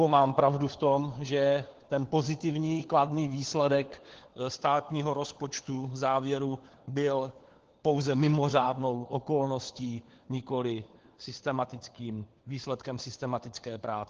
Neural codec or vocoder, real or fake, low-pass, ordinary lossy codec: codec, 16 kHz, 4 kbps, FunCodec, trained on LibriTTS, 50 frames a second; fake; 7.2 kHz; Opus, 16 kbps